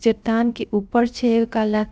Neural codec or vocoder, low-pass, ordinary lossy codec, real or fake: codec, 16 kHz, 0.3 kbps, FocalCodec; none; none; fake